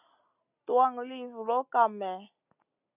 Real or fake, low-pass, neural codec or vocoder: real; 3.6 kHz; none